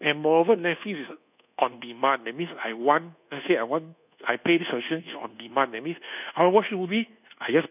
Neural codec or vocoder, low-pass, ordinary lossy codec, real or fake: codec, 24 kHz, 1.2 kbps, DualCodec; 3.6 kHz; none; fake